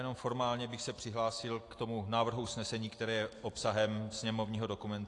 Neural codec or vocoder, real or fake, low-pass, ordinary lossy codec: none; real; 10.8 kHz; AAC, 48 kbps